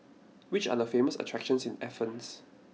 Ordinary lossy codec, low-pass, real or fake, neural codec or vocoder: none; none; real; none